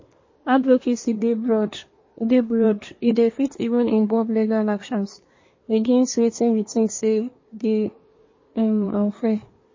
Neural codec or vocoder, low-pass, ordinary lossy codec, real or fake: codec, 24 kHz, 1 kbps, SNAC; 7.2 kHz; MP3, 32 kbps; fake